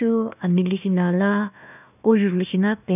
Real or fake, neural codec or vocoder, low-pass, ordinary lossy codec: fake; codec, 16 kHz, 1 kbps, FunCodec, trained on Chinese and English, 50 frames a second; 3.6 kHz; none